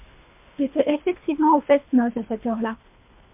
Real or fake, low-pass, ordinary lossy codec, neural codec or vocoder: fake; 3.6 kHz; MP3, 32 kbps; codec, 24 kHz, 3 kbps, HILCodec